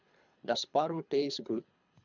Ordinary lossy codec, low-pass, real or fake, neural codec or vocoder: none; 7.2 kHz; fake; codec, 24 kHz, 3 kbps, HILCodec